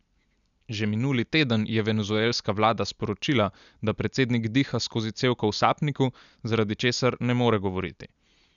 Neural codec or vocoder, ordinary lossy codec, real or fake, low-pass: none; none; real; 7.2 kHz